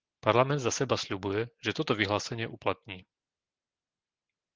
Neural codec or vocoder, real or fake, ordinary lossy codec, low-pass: none; real; Opus, 16 kbps; 7.2 kHz